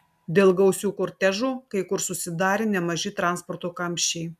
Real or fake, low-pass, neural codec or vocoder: real; 14.4 kHz; none